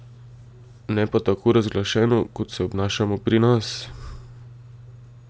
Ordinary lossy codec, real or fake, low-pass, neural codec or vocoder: none; real; none; none